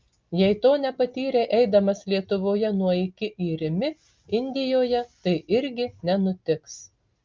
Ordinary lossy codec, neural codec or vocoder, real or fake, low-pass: Opus, 24 kbps; none; real; 7.2 kHz